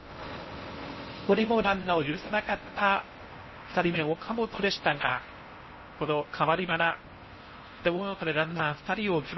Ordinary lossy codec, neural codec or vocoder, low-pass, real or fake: MP3, 24 kbps; codec, 16 kHz in and 24 kHz out, 0.6 kbps, FocalCodec, streaming, 4096 codes; 7.2 kHz; fake